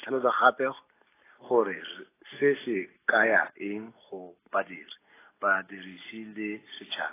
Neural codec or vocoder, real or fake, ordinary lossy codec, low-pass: none; real; AAC, 16 kbps; 3.6 kHz